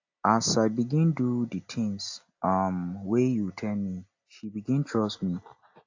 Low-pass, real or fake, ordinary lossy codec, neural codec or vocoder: 7.2 kHz; real; AAC, 48 kbps; none